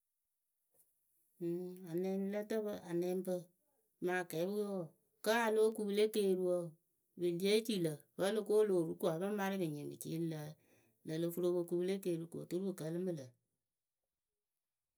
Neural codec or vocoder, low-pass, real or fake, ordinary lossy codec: none; none; real; none